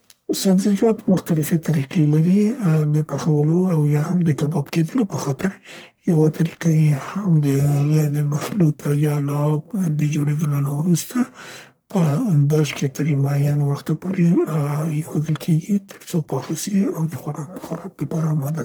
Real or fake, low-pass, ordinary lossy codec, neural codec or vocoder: fake; none; none; codec, 44.1 kHz, 1.7 kbps, Pupu-Codec